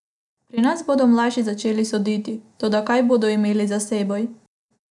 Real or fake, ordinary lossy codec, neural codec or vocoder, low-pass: real; none; none; 10.8 kHz